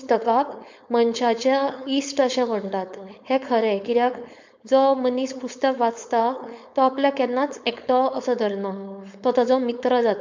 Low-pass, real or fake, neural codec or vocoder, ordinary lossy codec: 7.2 kHz; fake; codec, 16 kHz, 4.8 kbps, FACodec; MP3, 64 kbps